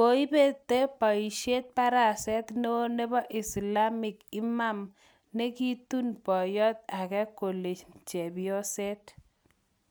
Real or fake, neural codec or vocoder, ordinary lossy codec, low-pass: real; none; none; none